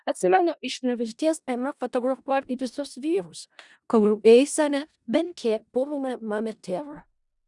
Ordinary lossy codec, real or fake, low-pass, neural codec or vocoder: Opus, 64 kbps; fake; 10.8 kHz; codec, 16 kHz in and 24 kHz out, 0.4 kbps, LongCat-Audio-Codec, four codebook decoder